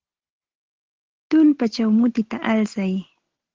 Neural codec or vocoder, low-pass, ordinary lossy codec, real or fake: none; 7.2 kHz; Opus, 16 kbps; real